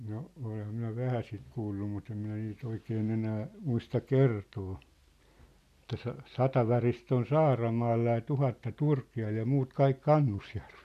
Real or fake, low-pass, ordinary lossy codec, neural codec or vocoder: real; 14.4 kHz; none; none